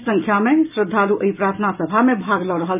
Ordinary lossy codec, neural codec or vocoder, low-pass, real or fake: none; none; 3.6 kHz; real